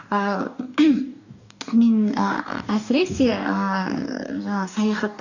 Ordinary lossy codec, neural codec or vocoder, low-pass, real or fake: none; codec, 44.1 kHz, 2.6 kbps, DAC; 7.2 kHz; fake